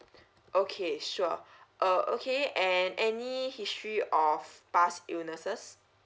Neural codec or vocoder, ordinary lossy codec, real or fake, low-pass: none; none; real; none